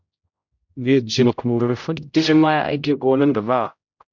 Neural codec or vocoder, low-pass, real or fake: codec, 16 kHz, 0.5 kbps, X-Codec, HuBERT features, trained on general audio; 7.2 kHz; fake